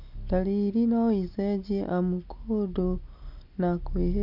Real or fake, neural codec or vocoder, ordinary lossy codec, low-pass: real; none; none; 5.4 kHz